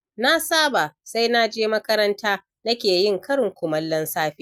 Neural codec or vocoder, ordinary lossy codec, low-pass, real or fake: none; none; 19.8 kHz; real